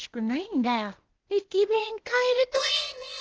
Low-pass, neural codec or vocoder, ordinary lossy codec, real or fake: 7.2 kHz; codec, 16 kHz, 0.8 kbps, ZipCodec; Opus, 16 kbps; fake